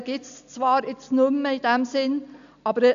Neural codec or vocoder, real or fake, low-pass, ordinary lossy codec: none; real; 7.2 kHz; none